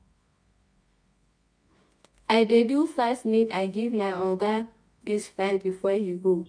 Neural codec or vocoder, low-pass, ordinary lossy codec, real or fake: codec, 24 kHz, 0.9 kbps, WavTokenizer, medium music audio release; 9.9 kHz; MP3, 64 kbps; fake